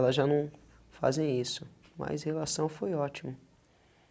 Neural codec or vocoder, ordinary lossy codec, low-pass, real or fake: none; none; none; real